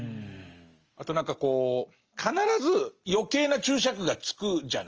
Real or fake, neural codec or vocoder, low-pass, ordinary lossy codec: real; none; 7.2 kHz; Opus, 24 kbps